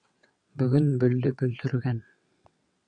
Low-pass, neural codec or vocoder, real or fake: 9.9 kHz; vocoder, 22.05 kHz, 80 mel bands, WaveNeXt; fake